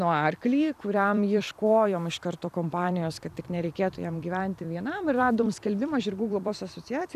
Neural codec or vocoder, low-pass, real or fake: vocoder, 44.1 kHz, 128 mel bands every 256 samples, BigVGAN v2; 14.4 kHz; fake